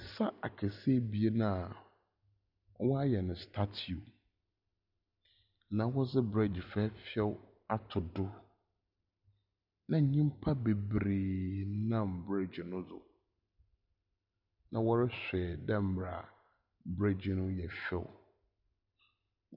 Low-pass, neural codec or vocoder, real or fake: 5.4 kHz; none; real